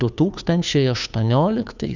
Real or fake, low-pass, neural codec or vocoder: fake; 7.2 kHz; codec, 16 kHz, 2 kbps, FunCodec, trained on Chinese and English, 25 frames a second